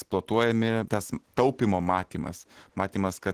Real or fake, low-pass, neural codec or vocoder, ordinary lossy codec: real; 14.4 kHz; none; Opus, 16 kbps